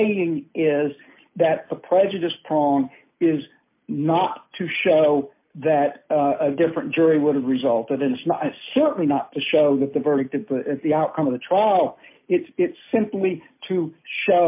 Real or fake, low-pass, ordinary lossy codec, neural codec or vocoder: real; 3.6 kHz; MP3, 24 kbps; none